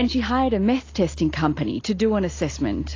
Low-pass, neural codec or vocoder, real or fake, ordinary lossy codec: 7.2 kHz; none; real; AAC, 32 kbps